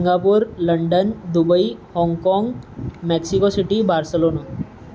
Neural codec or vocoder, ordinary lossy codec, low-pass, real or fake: none; none; none; real